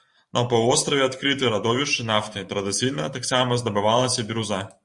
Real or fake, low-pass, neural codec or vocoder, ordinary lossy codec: fake; 10.8 kHz; vocoder, 44.1 kHz, 128 mel bands every 512 samples, BigVGAN v2; Opus, 64 kbps